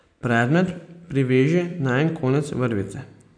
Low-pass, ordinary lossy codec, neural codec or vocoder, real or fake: 9.9 kHz; none; none; real